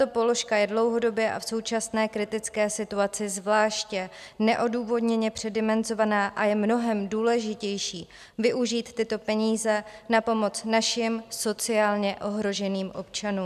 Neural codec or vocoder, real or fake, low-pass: none; real; 14.4 kHz